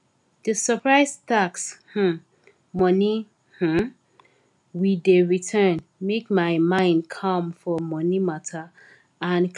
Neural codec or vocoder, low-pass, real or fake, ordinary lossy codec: none; 10.8 kHz; real; none